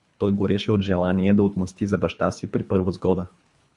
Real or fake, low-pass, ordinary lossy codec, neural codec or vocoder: fake; 10.8 kHz; MP3, 64 kbps; codec, 24 kHz, 3 kbps, HILCodec